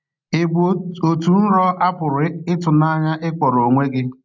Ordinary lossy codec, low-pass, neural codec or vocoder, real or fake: none; 7.2 kHz; none; real